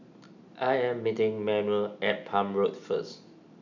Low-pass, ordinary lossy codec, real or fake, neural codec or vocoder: 7.2 kHz; none; real; none